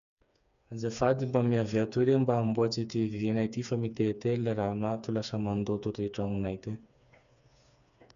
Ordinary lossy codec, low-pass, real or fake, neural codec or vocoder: none; 7.2 kHz; fake; codec, 16 kHz, 4 kbps, FreqCodec, smaller model